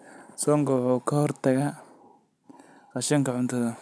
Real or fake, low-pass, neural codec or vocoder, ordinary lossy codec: real; none; none; none